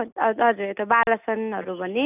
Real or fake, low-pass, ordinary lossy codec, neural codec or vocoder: real; 3.6 kHz; none; none